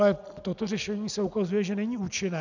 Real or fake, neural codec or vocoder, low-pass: real; none; 7.2 kHz